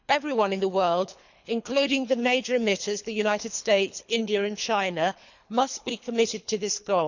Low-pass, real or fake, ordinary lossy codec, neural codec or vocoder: 7.2 kHz; fake; none; codec, 24 kHz, 3 kbps, HILCodec